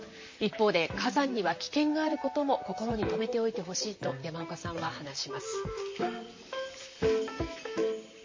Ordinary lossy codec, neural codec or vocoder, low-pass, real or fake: MP3, 32 kbps; vocoder, 44.1 kHz, 128 mel bands, Pupu-Vocoder; 7.2 kHz; fake